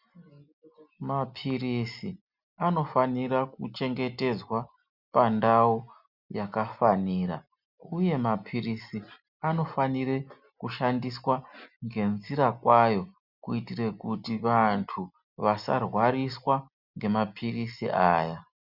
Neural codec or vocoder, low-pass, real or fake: none; 5.4 kHz; real